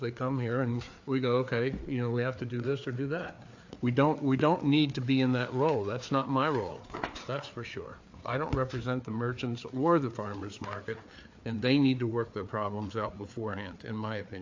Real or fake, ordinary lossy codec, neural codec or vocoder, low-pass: fake; AAC, 48 kbps; codec, 16 kHz, 4 kbps, FreqCodec, larger model; 7.2 kHz